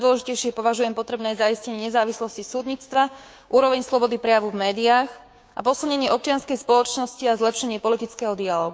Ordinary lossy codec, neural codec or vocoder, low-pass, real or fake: none; codec, 16 kHz, 6 kbps, DAC; none; fake